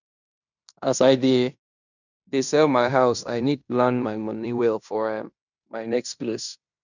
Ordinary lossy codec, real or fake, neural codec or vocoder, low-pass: none; fake; codec, 16 kHz in and 24 kHz out, 0.9 kbps, LongCat-Audio-Codec, fine tuned four codebook decoder; 7.2 kHz